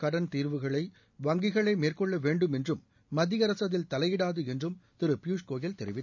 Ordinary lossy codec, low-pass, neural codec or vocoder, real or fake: none; 7.2 kHz; none; real